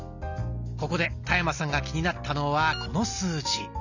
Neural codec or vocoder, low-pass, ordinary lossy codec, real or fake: none; 7.2 kHz; none; real